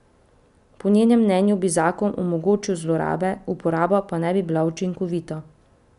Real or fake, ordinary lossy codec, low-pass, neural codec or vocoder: real; none; 10.8 kHz; none